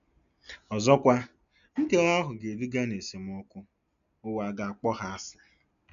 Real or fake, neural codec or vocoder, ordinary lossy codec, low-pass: real; none; none; 7.2 kHz